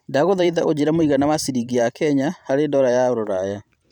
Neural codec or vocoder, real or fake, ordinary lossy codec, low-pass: vocoder, 44.1 kHz, 128 mel bands every 512 samples, BigVGAN v2; fake; none; 19.8 kHz